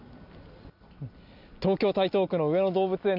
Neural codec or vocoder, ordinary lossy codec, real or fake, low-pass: none; none; real; 5.4 kHz